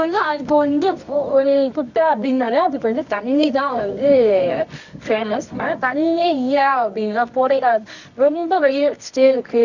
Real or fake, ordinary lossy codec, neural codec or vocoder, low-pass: fake; none; codec, 24 kHz, 0.9 kbps, WavTokenizer, medium music audio release; 7.2 kHz